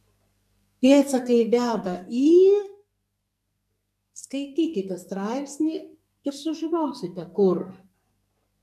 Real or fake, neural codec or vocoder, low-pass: fake; codec, 44.1 kHz, 2.6 kbps, SNAC; 14.4 kHz